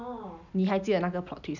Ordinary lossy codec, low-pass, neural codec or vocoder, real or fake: none; 7.2 kHz; none; real